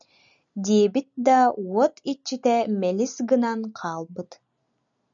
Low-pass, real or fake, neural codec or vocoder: 7.2 kHz; real; none